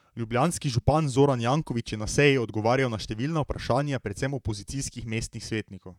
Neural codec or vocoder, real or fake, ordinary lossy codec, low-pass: vocoder, 44.1 kHz, 128 mel bands, Pupu-Vocoder; fake; none; 19.8 kHz